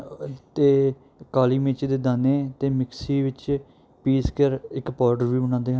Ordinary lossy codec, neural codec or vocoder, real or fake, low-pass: none; none; real; none